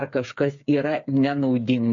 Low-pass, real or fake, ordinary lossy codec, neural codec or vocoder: 7.2 kHz; fake; MP3, 48 kbps; codec, 16 kHz, 8 kbps, FreqCodec, smaller model